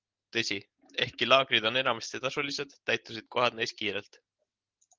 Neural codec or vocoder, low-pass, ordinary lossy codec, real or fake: none; 7.2 kHz; Opus, 16 kbps; real